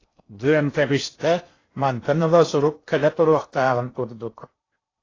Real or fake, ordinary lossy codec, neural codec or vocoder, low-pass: fake; AAC, 32 kbps; codec, 16 kHz in and 24 kHz out, 0.6 kbps, FocalCodec, streaming, 2048 codes; 7.2 kHz